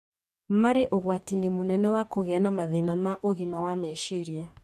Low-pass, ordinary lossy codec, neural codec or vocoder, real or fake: 14.4 kHz; none; codec, 44.1 kHz, 2.6 kbps, DAC; fake